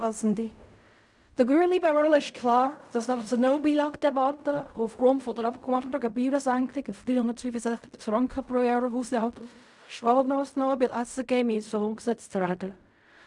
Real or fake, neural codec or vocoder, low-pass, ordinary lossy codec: fake; codec, 16 kHz in and 24 kHz out, 0.4 kbps, LongCat-Audio-Codec, fine tuned four codebook decoder; 10.8 kHz; none